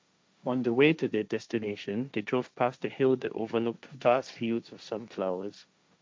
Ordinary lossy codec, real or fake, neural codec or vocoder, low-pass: none; fake; codec, 16 kHz, 1.1 kbps, Voila-Tokenizer; none